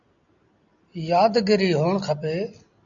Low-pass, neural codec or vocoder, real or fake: 7.2 kHz; none; real